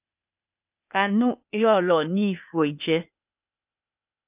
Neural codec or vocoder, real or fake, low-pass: codec, 16 kHz, 0.8 kbps, ZipCodec; fake; 3.6 kHz